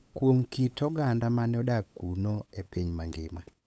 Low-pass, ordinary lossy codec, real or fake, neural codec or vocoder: none; none; fake; codec, 16 kHz, 8 kbps, FunCodec, trained on LibriTTS, 25 frames a second